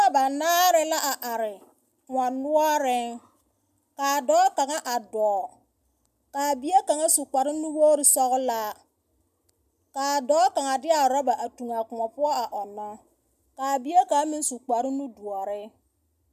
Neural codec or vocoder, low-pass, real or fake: none; 14.4 kHz; real